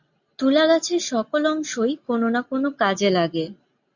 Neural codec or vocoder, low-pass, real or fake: none; 7.2 kHz; real